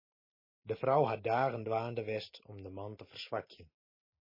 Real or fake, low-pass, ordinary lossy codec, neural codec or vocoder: real; 5.4 kHz; MP3, 24 kbps; none